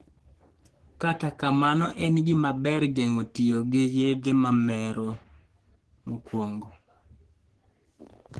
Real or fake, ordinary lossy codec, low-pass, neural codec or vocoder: fake; Opus, 16 kbps; 10.8 kHz; codec, 44.1 kHz, 3.4 kbps, Pupu-Codec